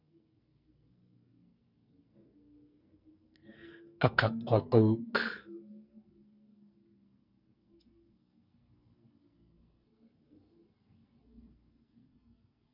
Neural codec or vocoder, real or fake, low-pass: codec, 44.1 kHz, 2.6 kbps, SNAC; fake; 5.4 kHz